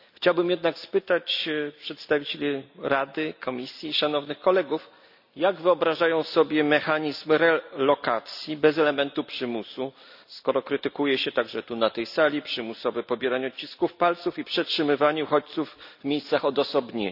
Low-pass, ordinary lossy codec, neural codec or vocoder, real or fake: 5.4 kHz; none; none; real